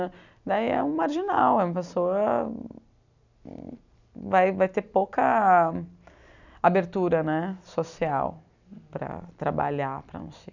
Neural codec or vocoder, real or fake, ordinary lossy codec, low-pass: none; real; none; 7.2 kHz